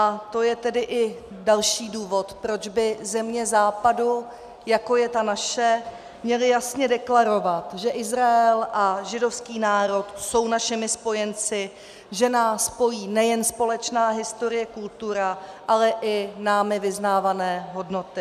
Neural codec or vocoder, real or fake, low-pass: none; real; 14.4 kHz